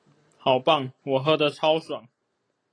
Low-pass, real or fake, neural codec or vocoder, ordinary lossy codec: 9.9 kHz; real; none; AAC, 32 kbps